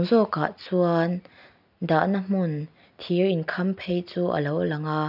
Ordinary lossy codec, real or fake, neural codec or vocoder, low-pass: none; real; none; 5.4 kHz